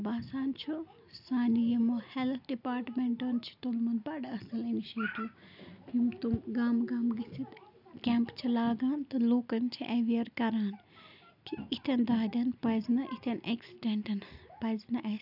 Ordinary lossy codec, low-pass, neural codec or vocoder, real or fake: none; 5.4 kHz; none; real